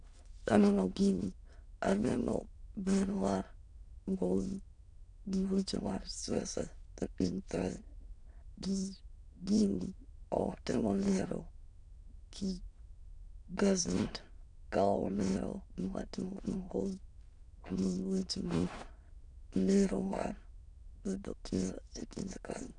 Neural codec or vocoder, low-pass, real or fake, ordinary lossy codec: autoencoder, 22.05 kHz, a latent of 192 numbers a frame, VITS, trained on many speakers; 9.9 kHz; fake; none